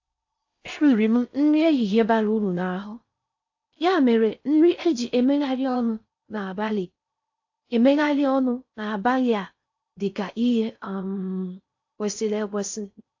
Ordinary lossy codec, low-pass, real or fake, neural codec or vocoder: AAC, 48 kbps; 7.2 kHz; fake; codec, 16 kHz in and 24 kHz out, 0.6 kbps, FocalCodec, streaming, 4096 codes